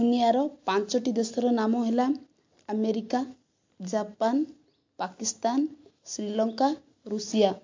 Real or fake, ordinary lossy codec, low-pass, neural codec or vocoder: real; MP3, 48 kbps; 7.2 kHz; none